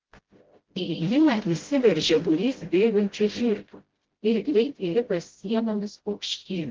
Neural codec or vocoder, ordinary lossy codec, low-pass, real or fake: codec, 16 kHz, 0.5 kbps, FreqCodec, smaller model; Opus, 16 kbps; 7.2 kHz; fake